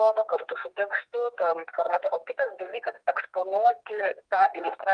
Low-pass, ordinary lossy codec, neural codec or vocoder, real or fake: 9.9 kHz; Opus, 32 kbps; codec, 32 kHz, 1.9 kbps, SNAC; fake